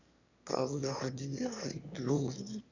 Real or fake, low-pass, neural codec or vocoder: fake; 7.2 kHz; autoencoder, 22.05 kHz, a latent of 192 numbers a frame, VITS, trained on one speaker